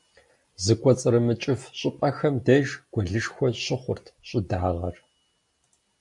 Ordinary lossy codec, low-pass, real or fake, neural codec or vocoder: AAC, 64 kbps; 10.8 kHz; real; none